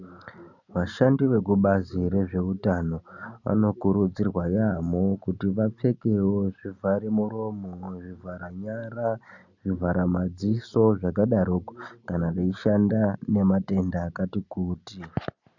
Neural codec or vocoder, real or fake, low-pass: none; real; 7.2 kHz